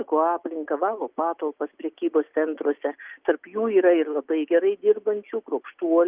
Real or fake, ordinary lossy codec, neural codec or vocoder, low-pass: real; Opus, 32 kbps; none; 3.6 kHz